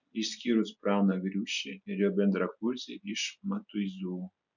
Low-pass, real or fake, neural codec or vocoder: 7.2 kHz; real; none